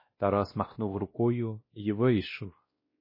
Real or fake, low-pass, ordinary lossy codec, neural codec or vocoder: fake; 5.4 kHz; MP3, 24 kbps; codec, 16 kHz, 1 kbps, X-Codec, WavLM features, trained on Multilingual LibriSpeech